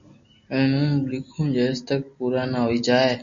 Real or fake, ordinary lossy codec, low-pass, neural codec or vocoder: real; MP3, 48 kbps; 7.2 kHz; none